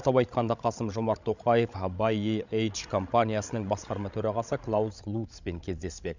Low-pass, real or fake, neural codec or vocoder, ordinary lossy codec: 7.2 kHz; fake; codec, 16 kHz, 16 kbps, FreqCodec, larger model; none